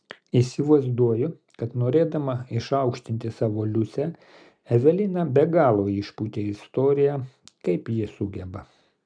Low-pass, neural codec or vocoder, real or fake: 9.9 kHz; none; real